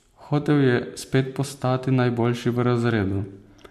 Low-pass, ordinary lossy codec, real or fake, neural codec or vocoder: 14.4 kHz; MP3, 64 kbps; real; none